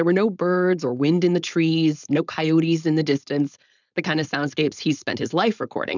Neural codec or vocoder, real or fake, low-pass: codec, 16 kHz, 4.8 kbps, FACodec; fake; 7.2 kHz